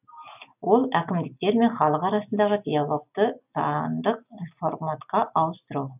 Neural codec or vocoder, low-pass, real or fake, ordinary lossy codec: none; 3.6 kHz; real; none